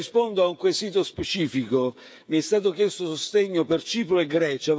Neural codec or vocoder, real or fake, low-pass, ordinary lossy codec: codec, 16 kHz, 8 kbps, FreqCodec, smaller model; fake; none; none